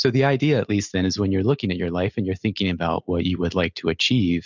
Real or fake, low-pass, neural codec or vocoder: real; 7.2 kHz; none